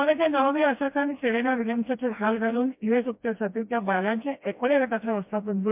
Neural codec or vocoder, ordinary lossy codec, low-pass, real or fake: codec, 16 kHz, 1 kbps, FreqCodec, smaller model; MP3, 32 kbps; 3.6 kHz; fake